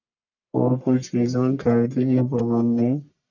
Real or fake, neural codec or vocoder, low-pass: fake; codec, 44.1 kHz, 1.7 kbps, Pupu-Codec; 7.2 kHz